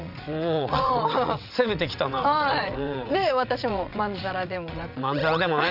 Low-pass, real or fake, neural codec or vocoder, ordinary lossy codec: 5.4 kHz; fake; vocoder, 22.05 kHz, 80 mel bands, WaveNeXt; none